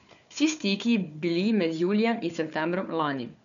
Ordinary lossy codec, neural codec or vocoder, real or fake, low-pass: none; codec, 16 kHz, 4 kbps, FunCodec, trained on Chinese and English, 50 frames a second; fake; 7.2 kHz